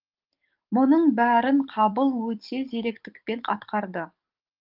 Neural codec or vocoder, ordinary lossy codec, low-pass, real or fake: codec, 44.1 kHz, 7.8 kbps, DAC; Opus, 32 kbps; 5.4 kHz; fake